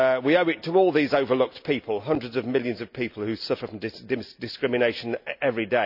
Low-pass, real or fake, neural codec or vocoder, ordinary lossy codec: 5.4 kHz; real; none; none